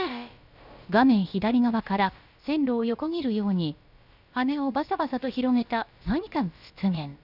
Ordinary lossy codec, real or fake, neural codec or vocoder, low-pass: none; fake; codec, 16 kHz, about 1 kbps, DyCAST, with the encoder's durations; 5.4 kHz